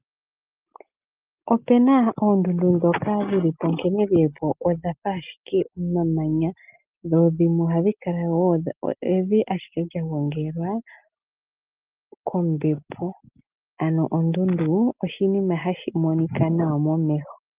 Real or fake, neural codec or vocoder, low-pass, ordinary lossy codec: real; none; 3.6 kHz; Opus, 32 kbps